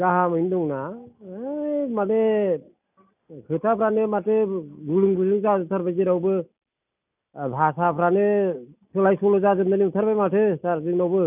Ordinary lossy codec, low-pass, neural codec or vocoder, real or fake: none; 3.6 kHz; none; real